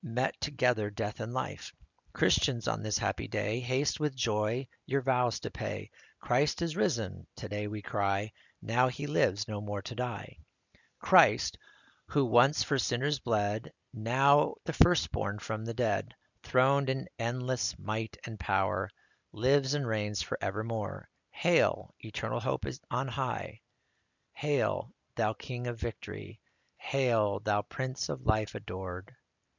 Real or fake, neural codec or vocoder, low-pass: real; none; 7.2 kHz